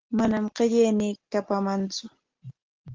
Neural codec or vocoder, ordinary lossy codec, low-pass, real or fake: none; Opus, 16 kbps; 7.2 kHz; real